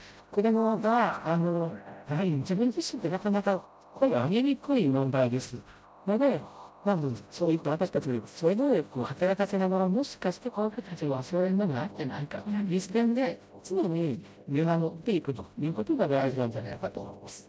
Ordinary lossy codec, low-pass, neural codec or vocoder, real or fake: none; none; codec, 16 kHz, 0.5 kbps, FreqCodec, smaller model; fake